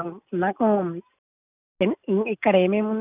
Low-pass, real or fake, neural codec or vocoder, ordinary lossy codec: 3.6 kHz; real; none; none